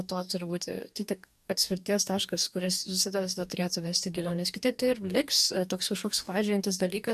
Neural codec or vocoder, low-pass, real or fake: codec, 44.1 kHz, 2.6 kbps, DAC; 14.4 kHz; fake